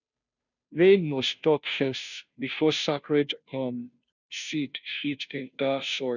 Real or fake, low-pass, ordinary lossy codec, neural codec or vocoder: fake; 7.2 kHz; none; codec, 16 kHz, 0.5 kbps, FunCodec, trained on Chinese and English, 25 frames a second